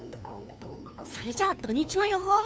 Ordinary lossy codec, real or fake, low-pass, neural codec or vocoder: none; fake; none; codec, 16 kHz, 2 kbps, FunCodec, trained on LibriTTS, 25 frames a second